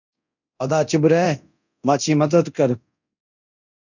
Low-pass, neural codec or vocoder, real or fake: 7.2 kHz; codec, 24 kHz, 0.9 kbps, DualCodec; fake